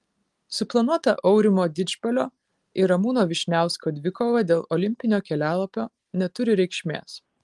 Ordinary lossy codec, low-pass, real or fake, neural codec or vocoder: Opus, 24 kbps; 10.8 kHz; real; none